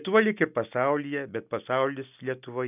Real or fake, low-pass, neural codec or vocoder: real; 3.6 kHz; none